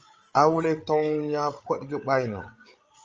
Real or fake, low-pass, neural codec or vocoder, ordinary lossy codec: fake; 7.2 kHz; codec, 16 kHz, 8 kbps, FreqCodec, larger model; Opus, 24 kbps